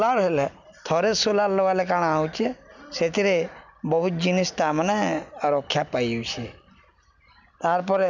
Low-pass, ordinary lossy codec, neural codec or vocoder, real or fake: 7.2 kHz; none; none; real